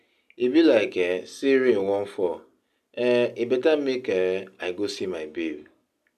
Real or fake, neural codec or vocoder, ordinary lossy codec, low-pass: real; none; none; 14.4 kHz